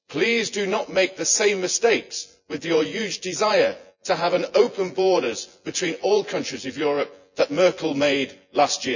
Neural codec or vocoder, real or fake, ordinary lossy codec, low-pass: vocoder, 24 kHz, 100 mel bands, Vocos; fake; none; 7.2 kHz